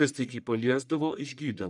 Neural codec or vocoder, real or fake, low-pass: codec, 44.1 kHz, 1.7 kbps, Pupu-Codec; fake; 10.8 kHz